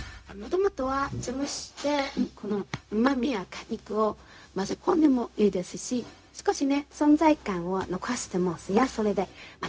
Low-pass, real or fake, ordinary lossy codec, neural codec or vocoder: none; fake; none; codec, 16 kHz, 0.4 kbps, LongCat-Audio-Codec